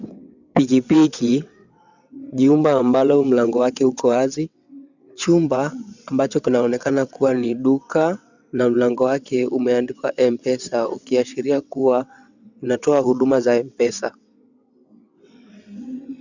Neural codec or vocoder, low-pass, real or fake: vocoder, 22.05 kHz, 80 mel bands, WaveNeXt; 7.2 kHz; fake